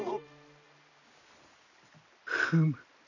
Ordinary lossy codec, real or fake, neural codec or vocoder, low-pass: none; real; none; 7.2 kHz